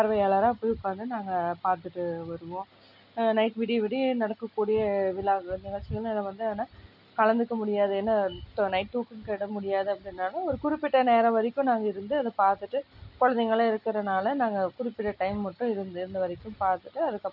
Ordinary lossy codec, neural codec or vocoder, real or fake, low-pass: none; none; real; 5.4 kHz